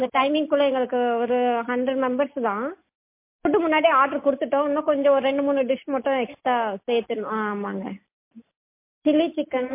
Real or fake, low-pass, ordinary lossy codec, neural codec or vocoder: real; 3.6 kHz; MP3, 24 kbps; none